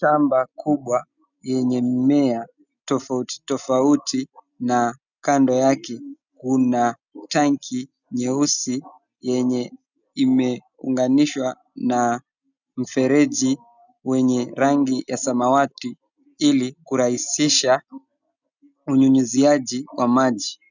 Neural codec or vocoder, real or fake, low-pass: none; real; 7.2 kHz